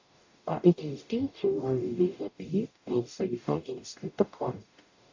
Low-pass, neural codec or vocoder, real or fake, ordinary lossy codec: 7.2 kHz; codec, 44.1 kHz, 0.9 kbps, DAC; fake; AAC, 48 kbps